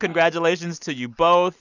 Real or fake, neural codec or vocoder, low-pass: real; none; 7.2 kHz